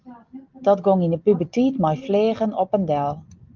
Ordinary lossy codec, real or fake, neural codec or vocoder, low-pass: Opus, 32 kbps; real; none; 7.2 kHz